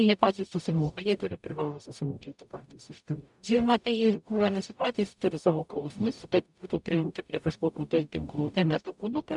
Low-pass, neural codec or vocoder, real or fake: 10.8 kHz; codec, 44.1 kHz, 0.9 kbps, DAC; fake